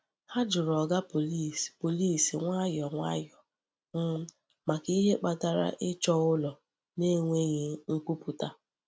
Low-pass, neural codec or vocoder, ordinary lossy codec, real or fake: none; none; none; real